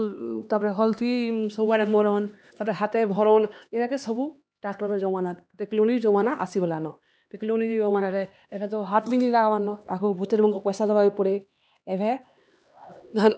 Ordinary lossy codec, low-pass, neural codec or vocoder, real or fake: none; none; codec, 16 kHz, 2 kbps, X-Codec, HuBERT features, trained on LibriSpeech; fake